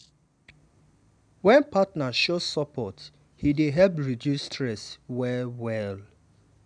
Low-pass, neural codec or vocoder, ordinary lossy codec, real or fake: 9.9 kHz; none; none; real